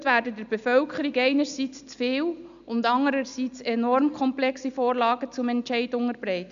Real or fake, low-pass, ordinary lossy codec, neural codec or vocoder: real; 7.2 kHz; MP3, 96 kbps; none